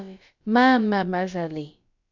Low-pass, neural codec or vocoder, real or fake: 7.2 kHz; codec, 16 kHz, about 1 kbps, DyCAST, with the encoder's durations; fake